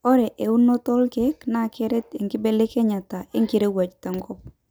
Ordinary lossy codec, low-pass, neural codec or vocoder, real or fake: none; none; none; real